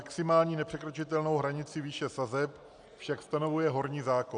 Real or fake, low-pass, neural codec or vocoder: real; 9.9 kHz; none